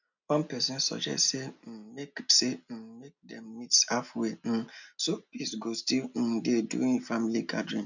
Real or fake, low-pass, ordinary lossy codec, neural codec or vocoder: real; 7.2 kHz; none; none